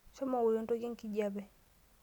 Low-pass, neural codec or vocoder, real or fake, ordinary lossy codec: 19.8 kHz; none; real; none